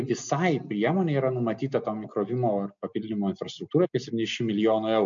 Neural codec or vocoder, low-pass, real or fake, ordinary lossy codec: none; 7.2 kHz; real; MP3, 48 kbps